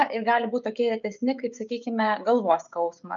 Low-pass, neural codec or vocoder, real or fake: 7.2 kHz; codec, 16 kHz, 16 kbps, FreqCodec, smaller model; fake